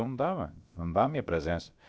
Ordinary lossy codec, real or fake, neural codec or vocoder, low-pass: none; fake; codec, 16 kHz, about 1 kbps, DyCAST, with the encoder's durations; none